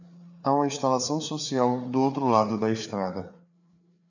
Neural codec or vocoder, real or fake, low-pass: codec, 16 kHz, 4 kbps, FreqCodec, larger model; fake; 7.2 kHz